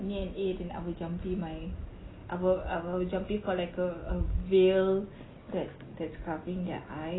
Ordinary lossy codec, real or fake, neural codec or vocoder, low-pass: AAC, 16 kbps; real; none; 7.2 kHz